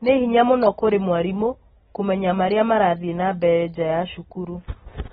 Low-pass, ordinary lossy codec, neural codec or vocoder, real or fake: 19.8 kHz; AAC, 16 kbps; none; real